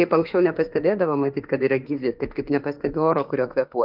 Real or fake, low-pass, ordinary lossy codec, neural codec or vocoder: fake; 5.4 kHz; Opus, 32 kbps; autoencoder, 48 kHz, 32 numbers a frame, DAC-VAE, trained on Japanese speech